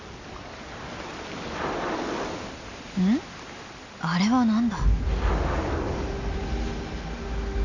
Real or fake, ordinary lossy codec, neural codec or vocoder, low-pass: real; none; none; 7.2 kHz